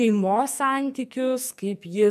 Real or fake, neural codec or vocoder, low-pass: fake; codec, 44.1 kHz, 2.6 kbps, SNAC; 14.4 kHz